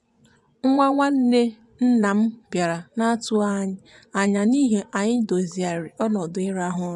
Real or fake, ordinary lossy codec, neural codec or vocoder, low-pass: fake; none; vocoder, 24 kHz, 100 mel bands, Vocos; 10.8 kHz